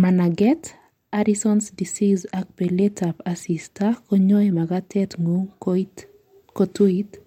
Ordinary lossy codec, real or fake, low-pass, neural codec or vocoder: MP3, 64 kbps; real; 19.8 kHz; none